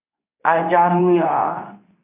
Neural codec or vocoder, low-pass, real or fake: codec, 24 kHz, 0.9 kbps, WavTokenizer, medium speech release version 2; 3.6 kHz; fake